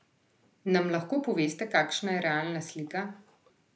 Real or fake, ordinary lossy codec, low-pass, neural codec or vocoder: real; none; none; none